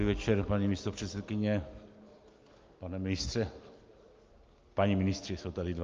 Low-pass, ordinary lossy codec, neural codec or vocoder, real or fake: 7.2 kHz; Opus, 24 kbps; none; real